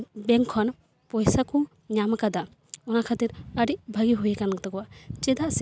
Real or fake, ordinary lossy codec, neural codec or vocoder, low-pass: real; none; none; none